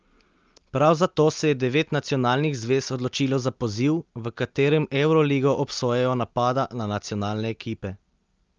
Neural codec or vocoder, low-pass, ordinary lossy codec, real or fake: none; 7.2 kHz; Opus, 24 kbps; real